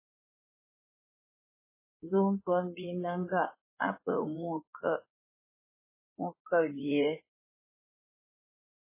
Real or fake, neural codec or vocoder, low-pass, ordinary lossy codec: fake; codec, 16 kHz in and 24 kHz out, 2.2 kbps, FireRedTTS-2 codec; 3.6 kHz; MP3, 16 kbps